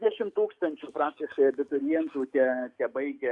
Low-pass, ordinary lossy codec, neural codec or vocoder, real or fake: 10.8 kHz; Opus, 64 kbps; codec, 44.1 kHz, 7.8 kbps, DAC; fake